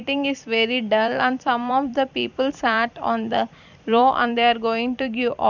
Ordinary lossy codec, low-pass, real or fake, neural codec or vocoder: none; 7.2 kHz; fake; vocoder, 44.1 kHz, 128 mel bands every 256 samples, BigVGAN v2